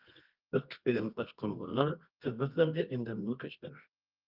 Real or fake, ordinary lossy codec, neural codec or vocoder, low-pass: fake; Opus, 24 kbps; codec, 24 kHz, 0.9 kbps, WavTokenizer, medium music audio release; 5.4 kHz